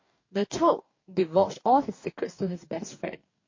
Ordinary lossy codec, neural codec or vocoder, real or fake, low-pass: MP3, 32 kbps; codec, 44.1 kHz, 2.6 kbps, DAC; fake; 7.2 kHz